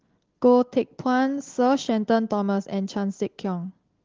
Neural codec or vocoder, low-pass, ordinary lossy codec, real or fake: none; 7.2 kHz; Opus, 16 kbps; real